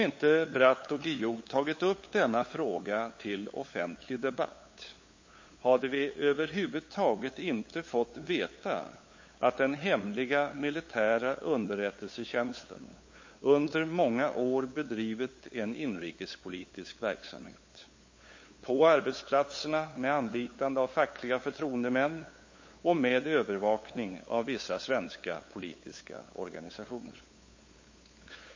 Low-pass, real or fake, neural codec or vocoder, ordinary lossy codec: 7.2 kHz; fake; codec, 16 kHz, 8 kbps, FunCodec, trained on LibriTTS, 25 frames a second; MP3, 32 kbps